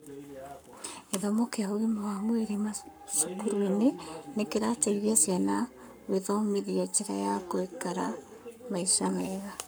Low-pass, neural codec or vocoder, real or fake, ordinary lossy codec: none; codec, 44.1 kHz, 7.8 kbps, Pupu-Codec; fake; none